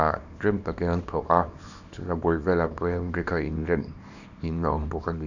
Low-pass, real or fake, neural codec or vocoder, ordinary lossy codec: 7.2 kHz; fake; codec, 24 kHz, 0.9 kbps, WavTokenizer, small release; none